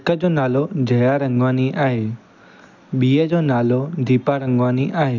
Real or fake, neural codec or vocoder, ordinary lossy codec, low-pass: real; none; none; 7.2 kHz